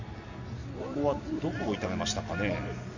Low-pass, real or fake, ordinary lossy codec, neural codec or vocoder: 7.2 kHz; real; none; none